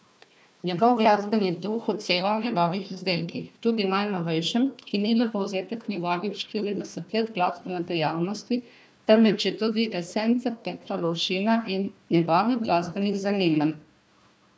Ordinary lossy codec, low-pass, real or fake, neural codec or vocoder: none; none; fake; codec, 16 kHz, 1 kbps, FunCodec, trained on Chinese and English, 50 frames a second